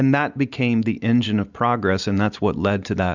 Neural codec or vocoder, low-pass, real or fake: none; 7.2 kHz; real